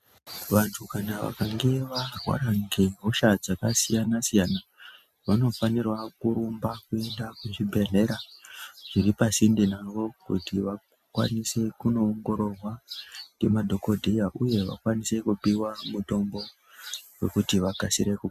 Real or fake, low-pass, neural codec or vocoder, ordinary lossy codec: real; 14.4 kHz; none; MP3, 96 kbps